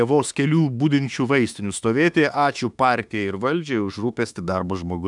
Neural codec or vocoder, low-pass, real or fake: autoencoder, 48 kHz, 32 numbers a frame, DAC-VAE, trained on Japanese speech; 10.8 kHz; fake